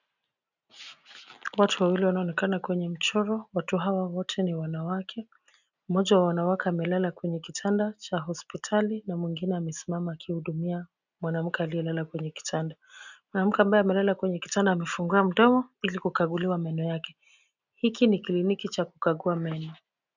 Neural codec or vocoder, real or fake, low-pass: none; real; 7.2 kHz